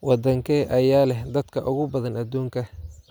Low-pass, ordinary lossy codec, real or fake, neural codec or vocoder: none; none; real; none